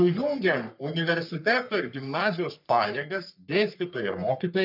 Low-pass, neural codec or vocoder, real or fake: 5.4 kHz; codec, 44.1 kHz, 3.4 kbps, Pupu-Codec; fake